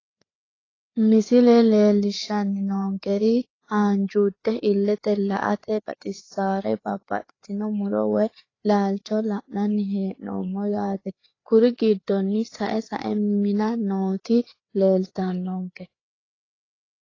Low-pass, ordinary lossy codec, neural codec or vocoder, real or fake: 7.2 kHz; AAC, 32 kbps; codec, 16 kHz, 4 kbps, FreqCodec, larger model; fake